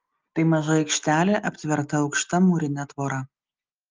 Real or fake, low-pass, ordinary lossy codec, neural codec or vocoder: real; 7.2 kHz; Opus, 24 kbps; none